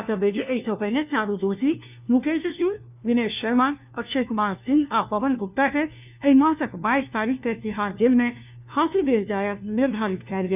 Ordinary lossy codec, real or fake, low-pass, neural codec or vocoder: none; fake; 3.6 kHz; codec, 16 kHz, 1 kbps, FunCodec, trained on LibriTTS, 50 frames a second